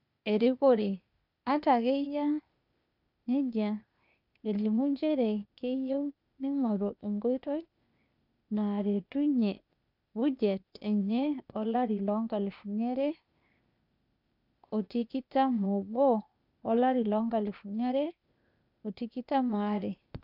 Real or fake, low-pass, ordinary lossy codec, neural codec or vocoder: fake; 5.4 kHz; none; codec, 16 kHz, 0.8 kbps, ZipCodec